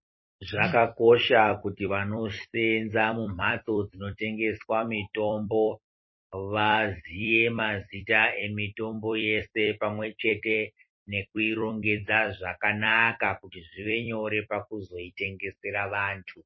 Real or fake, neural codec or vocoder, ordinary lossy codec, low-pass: fake; vocoder, 44.1 kHz, 128 mel bands every 256 samples, BigVGAN v2; MP3, 24 kbps; 7.2 kHz